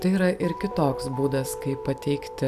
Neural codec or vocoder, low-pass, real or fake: vocoder, 44.1 kHz, 128 mel bands every 256 samples, BigVGAN v2; 14.4 kHz; fake